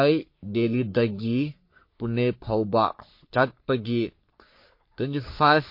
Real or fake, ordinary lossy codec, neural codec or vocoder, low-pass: fake; MP3, 32 kbps; codec, 44.1 kHz, 3.4 kbps, Pupu-Codec; 5.4 kHz